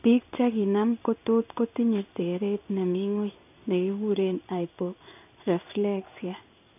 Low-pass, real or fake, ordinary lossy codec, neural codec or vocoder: 3.6 kHz; fake; none; codec, 16 kHz in and 24 kHz out, 1 kbps, XY-Tokenizer